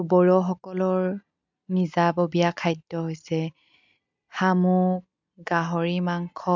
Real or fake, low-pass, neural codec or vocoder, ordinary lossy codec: real; 7.2 kHz; none; MP3, 64 kbps